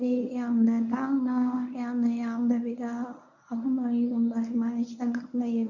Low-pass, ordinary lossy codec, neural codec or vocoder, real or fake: 7.2 kHz; none; codec, 24 kHz, 0.9 kbps, WavTokenizer, medium speech release version 1; fake